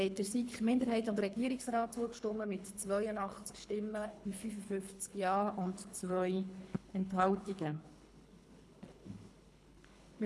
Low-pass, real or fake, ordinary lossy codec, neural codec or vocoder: none; fake; none; codec, 24 kHz, 3 kbps, HILCodec